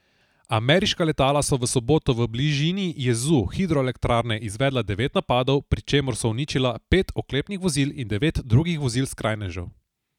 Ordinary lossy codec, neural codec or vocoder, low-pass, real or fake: none; none; 19.8 kHz; real